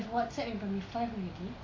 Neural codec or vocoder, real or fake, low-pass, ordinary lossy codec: none; real; 7.2 kHz; AAC, 32 kbps